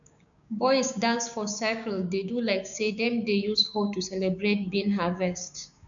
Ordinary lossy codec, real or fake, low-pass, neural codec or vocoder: none; fake; 7.2 kHz; codec, 16 kHz, 6 kbps, DAC